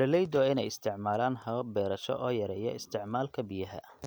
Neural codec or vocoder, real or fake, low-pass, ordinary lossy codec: none; real; none; none